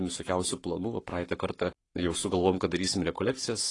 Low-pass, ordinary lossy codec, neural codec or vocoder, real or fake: 10.8 kHz; AAC, 32 kbps; codec, 44.1 kHz, 7.8 kbps, Pupu-Codec; fake